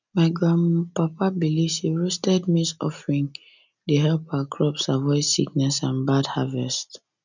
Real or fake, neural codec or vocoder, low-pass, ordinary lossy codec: real; none; 7.2 kHz; none